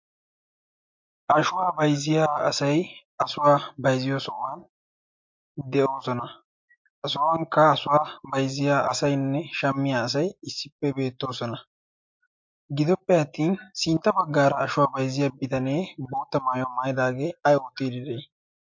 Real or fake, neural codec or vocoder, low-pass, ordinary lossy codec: real; none; 7.2 kHz; MP3, 48 kbps